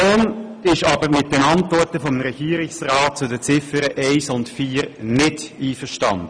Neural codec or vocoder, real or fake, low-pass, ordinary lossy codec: none; real; 9.9 kHz; none